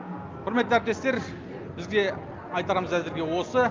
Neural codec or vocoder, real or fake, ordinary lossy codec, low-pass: none; real; Opus, 24 kbps; 7.2 kHz